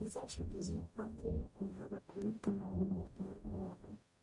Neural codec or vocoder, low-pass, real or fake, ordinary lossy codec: codec, 44.1 kHz, 0.9 kbps, DAC; 10.8 kHz; fake; MP3, 48 kbps